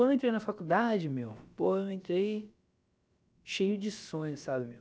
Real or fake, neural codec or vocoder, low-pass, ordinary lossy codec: fake; codec, 16 kHz, about 1 kbps, DyCAST, with the encoder's durations; none; none